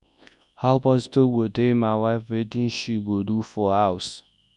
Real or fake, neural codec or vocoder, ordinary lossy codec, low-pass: fake; codec, 24 kHz, 0.9 kbps, WavTokenizer, large speech release; none; 10.8 kHz